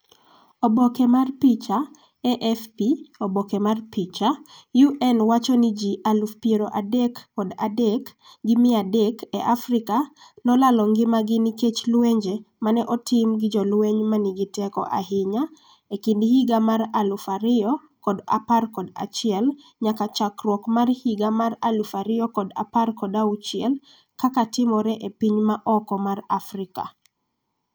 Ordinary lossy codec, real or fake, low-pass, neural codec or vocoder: none; real; none; none